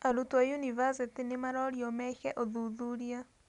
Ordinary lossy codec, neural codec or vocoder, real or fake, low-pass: none; none; real; 10.8 kHz